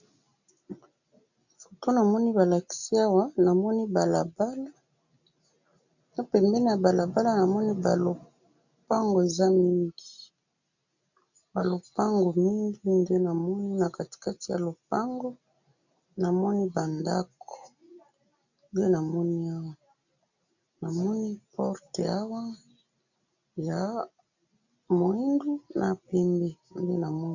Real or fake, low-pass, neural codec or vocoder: real; 7.2 kHz; none